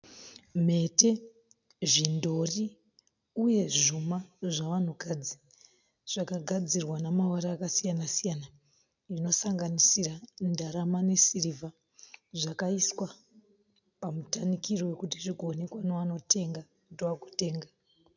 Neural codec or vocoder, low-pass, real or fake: none; 7.2 kHz; real